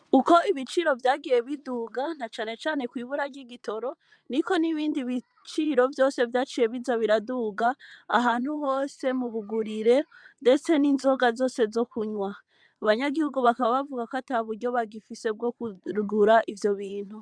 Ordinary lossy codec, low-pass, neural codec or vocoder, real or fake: MP3, 96 kbps; 9.9 kHz; vocoder, 22.05 kHz, 80 mel bands, WaveNeXt; fake